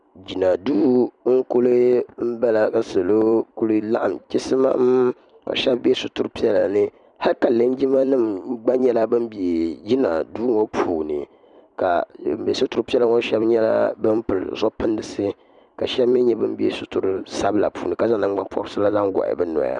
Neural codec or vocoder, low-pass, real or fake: vocoder, 44.1 kHz, 128 mel bands every 256 samples, BigVGAN v2; 10.8 kHz; fake